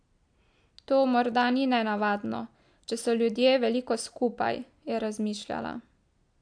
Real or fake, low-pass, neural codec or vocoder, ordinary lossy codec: real; 9.9 kHz; none; AAC, 64 kbps